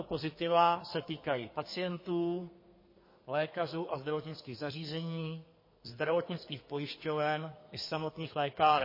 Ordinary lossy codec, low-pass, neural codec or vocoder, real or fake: MP3, 24 kbps; 5.4 kHz; codec, 32 kHz, 1.9 kbps, SNAC; fake